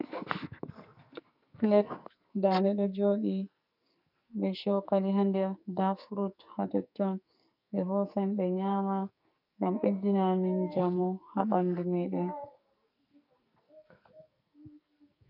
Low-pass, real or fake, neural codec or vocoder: 5.4 kHz; fake; codec, 44.1 kHz, 2.6 kbps, SNAC